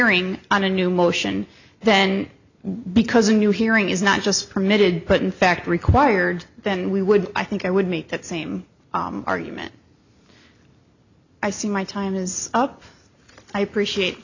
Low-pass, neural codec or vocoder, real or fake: 7.2 kHz; none; real